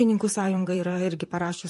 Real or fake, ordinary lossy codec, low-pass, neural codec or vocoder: fake; MP3, 48 kbps; 14.4 kHz; vocoder, 44.1 kHz, 128 mel bands, Pupu-Vocoder